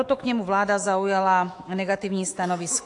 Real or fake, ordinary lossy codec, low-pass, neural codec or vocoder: fake; AAC, 48 kbps; 10.8 kHz; autoencoder, 48 kHz, 128 numbers a frame, DAC-VAE, trained on Japanese speech